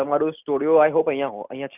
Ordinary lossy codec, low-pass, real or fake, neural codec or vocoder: none; 3.6 kHz; real; none